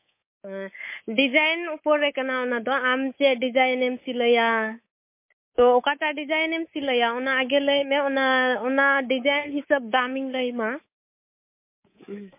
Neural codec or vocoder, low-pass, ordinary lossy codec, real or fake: codec, 24 kHz, 3.1 kbps, DualCodec; 3.6 kHz; MP3, 24 kbps; fake